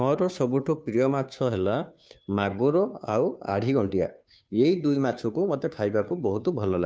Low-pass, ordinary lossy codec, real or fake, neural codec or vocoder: none; none; fake; codec, 16 kHz, 2 kbps, FunCodec, trained on Chinese and English, 25 frames a second